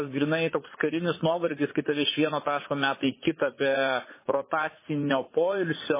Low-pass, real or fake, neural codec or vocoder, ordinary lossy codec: 3.6 kHz; fake; vocoder, 44.1 kHz, 80 mel bands, Vocos; MP3, 16 kbps